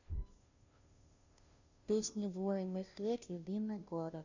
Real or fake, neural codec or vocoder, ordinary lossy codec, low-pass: fake; codec, 16 kHz, 0.5 kbps, FunCodec, trained on Chinese and English, 25 frames a second; none; 7.2 kHz